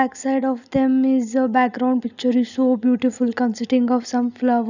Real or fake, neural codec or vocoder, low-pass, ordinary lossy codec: real; none; 7.2 kHz; none